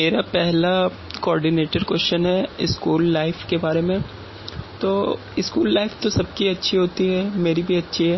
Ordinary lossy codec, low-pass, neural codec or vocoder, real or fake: MP3, 24 kbps; 7.2 kHz; none; real